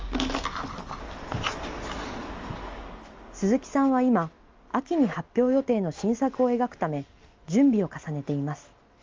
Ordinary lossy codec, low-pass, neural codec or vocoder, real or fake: Opus, 32 kbps; 7.2 kHz; none; real